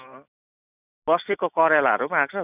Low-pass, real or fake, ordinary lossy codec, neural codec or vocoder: 3.6 kHz; real; none; none